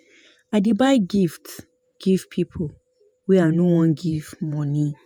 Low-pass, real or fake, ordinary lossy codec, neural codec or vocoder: none; fake; none; vocoder, 48 kHz, 128 mel bands, Vocos